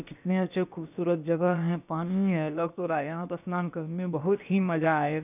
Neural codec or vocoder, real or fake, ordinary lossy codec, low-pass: codec, 16 kHz, about 1 kbps, DyCAST, with the encoder's durations; fake; none; 3.6 kHz